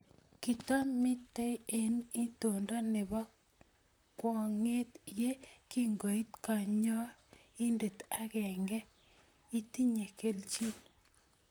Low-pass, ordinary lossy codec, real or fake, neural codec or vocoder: none; none; fake; vocoder, 44.1 kHz, 128 mel bands, Pupu-Vocoder